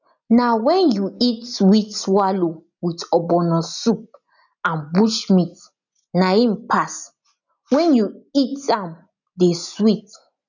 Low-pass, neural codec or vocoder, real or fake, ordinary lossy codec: 7.2 kHz; none; real; none